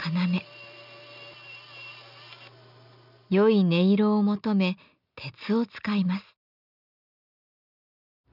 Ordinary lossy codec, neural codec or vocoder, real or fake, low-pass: none; none; real; 5.4 kHz